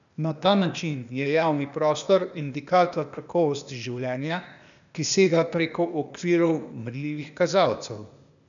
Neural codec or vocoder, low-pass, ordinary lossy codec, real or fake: codec, 16 kHz, 0.8 kbps, ZipCodec; 7.2 kHz; none; fake